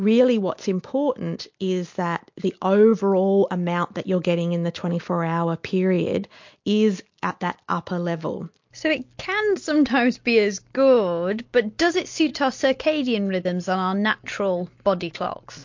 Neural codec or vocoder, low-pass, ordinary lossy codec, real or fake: none; 7.2 kHz; MP3, 48 kbps; real